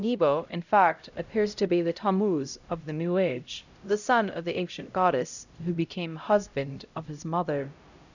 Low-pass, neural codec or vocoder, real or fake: 7.2 kHz; codec, 16 kHz, 0.5 kbps, X-Codec, HuBERT features, trained on LibriSpeech; fake